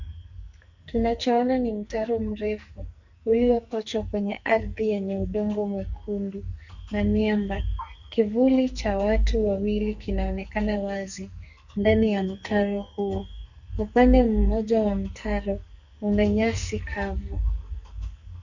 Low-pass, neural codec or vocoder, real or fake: 7.2 kHz; codec, 44.1 kHz, 2.6 kbps, SNAC; fake